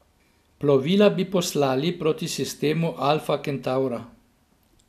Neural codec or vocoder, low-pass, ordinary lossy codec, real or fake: none; 14.4 kHz; none; real